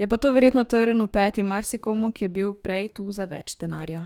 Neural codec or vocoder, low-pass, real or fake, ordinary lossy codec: codec, 44.1 kHz, 2.6 kbps, DAC; 19.8 kHz; fake; none